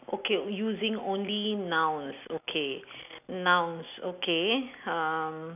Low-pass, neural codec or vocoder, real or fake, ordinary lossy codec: 3.6 kHz; none; real; none